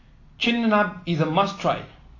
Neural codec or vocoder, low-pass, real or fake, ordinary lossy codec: none; 7.2 kHz; real; AAC, 32 kbps